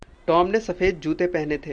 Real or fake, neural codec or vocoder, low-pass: real; none; 9.9 kHz